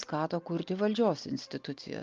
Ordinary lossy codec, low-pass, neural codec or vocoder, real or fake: Opus, 24 kbps; 7.2 kHz; none; real